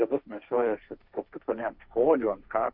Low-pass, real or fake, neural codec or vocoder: 5.4 kHz; fake; codec, 16 kHz, 1.1 kbps, Voila-Tokenizer